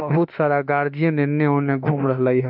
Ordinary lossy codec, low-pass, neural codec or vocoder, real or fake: MP3, 48 kbps; 5.4 kHz; autoencoder, 48 kHz, 32 numbers a frame, DAC-VAE, trained on Japanese speech; fake